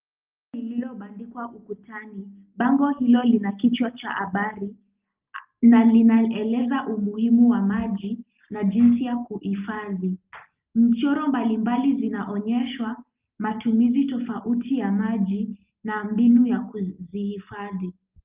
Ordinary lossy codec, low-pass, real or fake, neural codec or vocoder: Opus, 24 kbps; 3.6 kHz; real; none